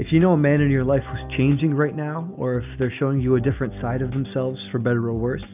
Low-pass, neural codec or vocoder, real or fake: 3.6 kHz; none; real